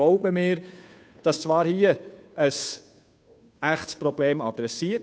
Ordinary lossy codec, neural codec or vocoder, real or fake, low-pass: none; codec, 16 kHz, 2 kbps, FunCodec, trained on Chinese and English, 25 frames a second; fake; none